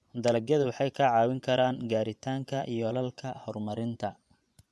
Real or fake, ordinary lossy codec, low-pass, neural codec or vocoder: fake; none; none; vocoder, 24 kHz, 100 mel bands, Vocos